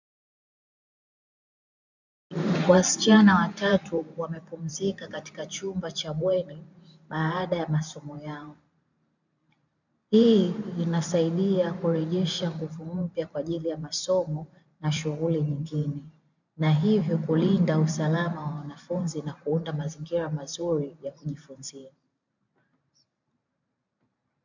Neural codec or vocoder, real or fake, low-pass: vocoder, 44.1 kHz, 128 mel bands every 512 samples, BigVGAN v2; fake; 7.2 kHz